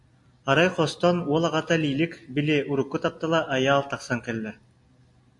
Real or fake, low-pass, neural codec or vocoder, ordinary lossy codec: real; 10.8 kHz; none; AAC, 64 kbps